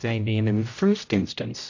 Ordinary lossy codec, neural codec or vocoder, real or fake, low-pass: AAC, 48 kbps; codec, 16 kHz, 0.5 kbps, X-Codec, HuBERT features, trained on general audio; fake; 7.2 kHz